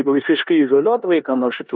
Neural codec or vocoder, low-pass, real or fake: codec, 16 kHz in and 24 kHz out, 0.9 kbps, LongCat-Audio-Codec, four codebook decoder; 7.2 kHz; fake